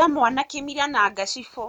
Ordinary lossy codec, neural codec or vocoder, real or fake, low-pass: none; none; real; 19.8 kHz